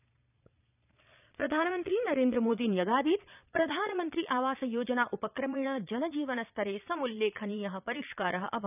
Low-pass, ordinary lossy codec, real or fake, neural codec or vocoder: 3.6 kHz; none; fake; vocoder, 22.05 kHz, 80 mel bands, Vocos